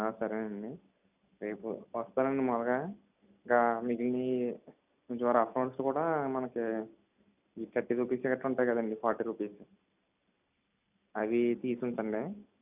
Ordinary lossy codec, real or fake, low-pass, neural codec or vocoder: none; real; 3.6 kHz; none